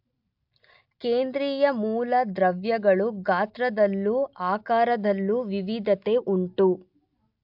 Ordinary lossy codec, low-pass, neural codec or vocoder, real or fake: AAC, 48 kbps; 5.4 kHz; none; real